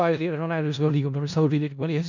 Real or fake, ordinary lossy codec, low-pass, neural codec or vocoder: fake; none; 7.2 kHz; codec, 16 kHz in and 24 kHz out, 0.4 kbps, LongCat-Audio-Codec, four codebook decoder